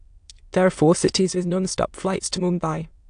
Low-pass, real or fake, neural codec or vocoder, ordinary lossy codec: 9.9 kHz; fake; autoencoder, 22.05 kHz, a latent of 192 numbers a frame, VITS, trained on many speakers; AAC, 64 kbps